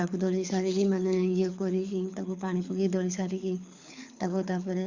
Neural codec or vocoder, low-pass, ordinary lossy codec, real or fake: codec, 24 kHz, 6 kbps, HILCodec; 7.2 kHz; Opus, 64 kbps; fake